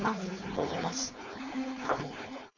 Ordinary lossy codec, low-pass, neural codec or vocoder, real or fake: none; 7.2 kHz; codec, 16 kHz, 4.8 kbps, FACodec; fake